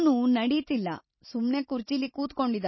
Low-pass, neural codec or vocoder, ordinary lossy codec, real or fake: 7.2 kHz; none; MP3, 24 kbps; real